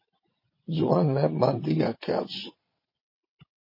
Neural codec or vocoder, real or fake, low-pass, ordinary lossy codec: vocoder, 22.05 kHz, 80 mel bands, WaveNeXt; fake; 5.4 kHz; MP3, 24 kbps